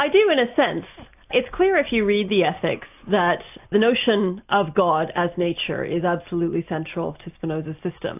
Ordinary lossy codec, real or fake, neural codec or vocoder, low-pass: AAC, 32 kbps; real; none; 3.6 kHz